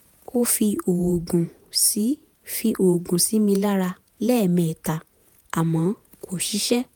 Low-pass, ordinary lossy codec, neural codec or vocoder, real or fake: none; none; vocoder, 48 kHz, 128 mel bands, Vocos; fake